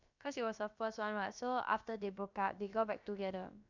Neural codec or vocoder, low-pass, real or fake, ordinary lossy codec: codec, 16 kHz, about 1 kbps, DyCAST, with the encoder's durations; 7.2 kHz; fake; none